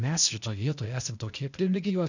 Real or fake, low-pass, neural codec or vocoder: fake; 7.2 kHz; codec, 16 kHz, 0.8 kbps, ZipCodec